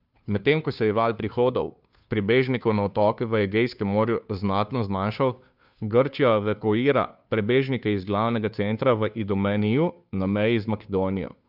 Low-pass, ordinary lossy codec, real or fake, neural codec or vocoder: 5.4 kHz; none; fake; codec, 16 kHz, 2 kbps, FunCodec, trained on Chinese and English, 25 frames a second